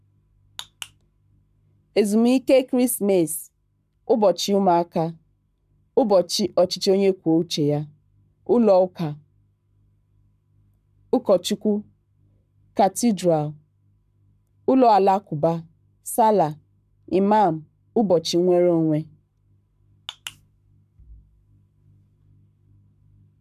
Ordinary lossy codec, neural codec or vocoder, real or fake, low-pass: none; codec, 44.1 kHz, 7.8 kbps, Pupu-Codec; fake; 14.4 kHz